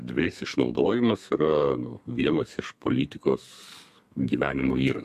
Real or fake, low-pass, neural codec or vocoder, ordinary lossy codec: fake; 14.4 kHz; codec, 32 kHz, 1.9 kbps, SNAC; MP3, 64 kbps